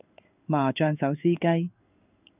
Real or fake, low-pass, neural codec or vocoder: fake; 3.6 kHz; codec, 16 kHz in and 24 kHz out, 1 kbps, XY-Tokenizer